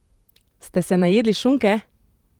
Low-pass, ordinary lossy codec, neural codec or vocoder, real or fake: 19.8 kHz; Opus, 24 kbps; vocoder, 44.1 kHz, 128 mel bands, Pupu-Vocoder; fake